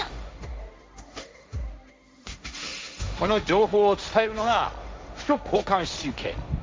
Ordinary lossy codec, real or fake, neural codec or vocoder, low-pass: none; fake; codec, 16 kHz, 1.1 kbps, Voila-Tokenizer; none